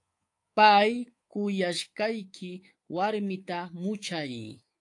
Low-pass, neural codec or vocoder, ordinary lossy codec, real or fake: 10.8 kHz; codec, 24 kHz, 3.1 kbps, DualCodec; AAC, 48 kbps; fake